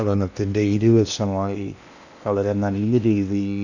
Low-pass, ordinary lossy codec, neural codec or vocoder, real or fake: 7.2 kHz; none; codec, 16 kHz in and 24 kHz out, 0.8 kbps, FocalCodec, streaming, 65536 codes; fake